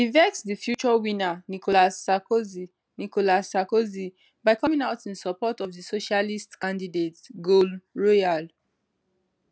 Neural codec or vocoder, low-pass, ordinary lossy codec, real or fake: none; none; none; real